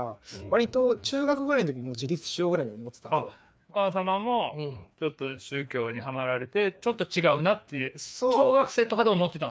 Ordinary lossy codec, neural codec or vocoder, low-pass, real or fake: none; codec, 16 kHz, 2 kbps, FreqCodec, larger model; none; fake